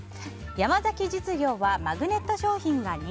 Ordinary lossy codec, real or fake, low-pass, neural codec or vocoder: none; real; none; none